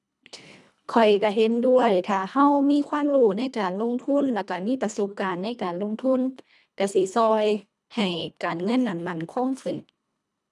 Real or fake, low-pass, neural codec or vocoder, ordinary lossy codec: fake; none; codec, 24 kHz, 1.5 kbps, HILCodec; none